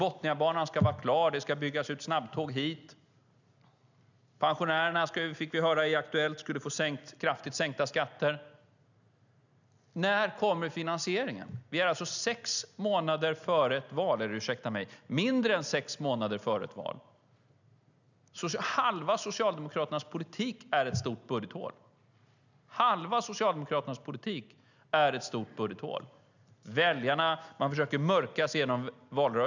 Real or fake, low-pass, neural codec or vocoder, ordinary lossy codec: real; 7.2 kHz; none; none